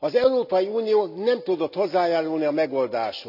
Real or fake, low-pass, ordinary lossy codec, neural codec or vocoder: real; 5.4 kHz; none; none